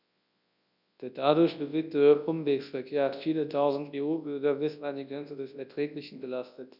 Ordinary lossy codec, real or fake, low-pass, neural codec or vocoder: none; fake; 5.4 kHz; codec, 24 kHz, 0.9 kbps, WavTokenizer, large speech release